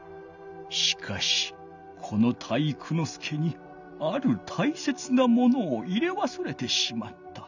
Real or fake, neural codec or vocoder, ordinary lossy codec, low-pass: real; none; none; 7.2 kHz